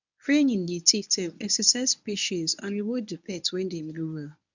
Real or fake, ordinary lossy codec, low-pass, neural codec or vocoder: fake; none; 7.2 kHz; codec, 24 kHz, 0.9 kbps, WavTokenizer, medium speech release version 1